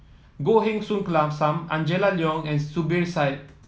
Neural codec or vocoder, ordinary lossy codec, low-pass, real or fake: none; none; none; real